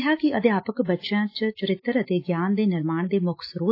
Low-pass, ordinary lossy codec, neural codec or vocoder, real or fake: 5.4 kHz; AAC, 32 kbps; none; real